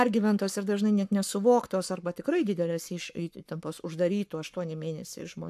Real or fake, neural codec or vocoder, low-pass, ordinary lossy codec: fake; codec, 44.1 kHz, 7.8 kbps, Pupu-Codec; 14.4 kHz; AAC, 96 kbps